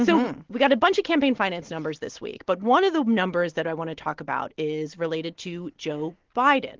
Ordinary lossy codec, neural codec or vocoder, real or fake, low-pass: Opus, 16 kbps; none; real; 7.2 kHz